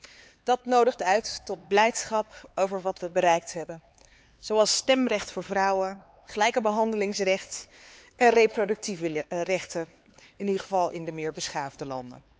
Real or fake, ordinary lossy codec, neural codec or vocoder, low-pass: fake; none; codec, 16 kHz, 4 kbps, X-Codec, HuBERT features, trained on LibriSpeech; none